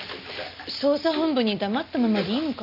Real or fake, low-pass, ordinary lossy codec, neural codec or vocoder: real; 5.4 kHz; none; none